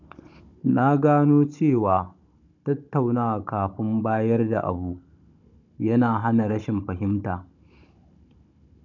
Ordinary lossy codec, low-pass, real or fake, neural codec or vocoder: none; 7.2 kHz; fake; codec, 16 kHz, 16 kbps, FunCodec, trained on Chinese and English, 50 frames a second